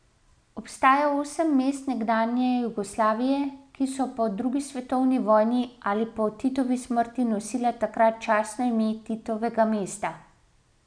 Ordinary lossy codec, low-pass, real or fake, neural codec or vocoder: none; 9.9 kHz; real; none